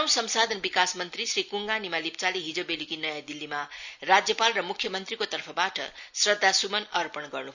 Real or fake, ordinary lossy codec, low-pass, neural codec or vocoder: real; none; 7.2 kHz; none